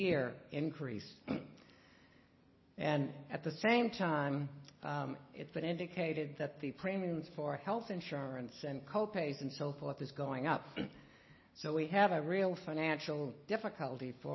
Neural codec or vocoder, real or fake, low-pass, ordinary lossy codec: none; real; 7.2 kHz; MP3, 24 kbps